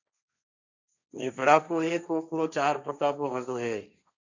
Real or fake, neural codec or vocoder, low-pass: fake; codec, 16 kHz, 1.1 kbps, Voila-Tokenizer; 7.2 kHz